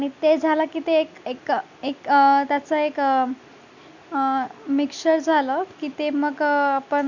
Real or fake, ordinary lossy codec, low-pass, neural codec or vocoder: real; none; 7.2 kHz; none